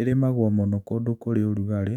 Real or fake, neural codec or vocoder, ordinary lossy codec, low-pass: fake; autoencoder, 48 kHz, 128 numbers a frame, DAC-VAE, trained on Japanese speech; none; 19.8 kHz